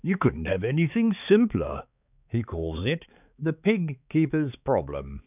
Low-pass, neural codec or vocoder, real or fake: 3.6 kHz; codec, 16 kHz, 4 kbps, X-Codec, HuBERT features, trained on balanced general audio; fake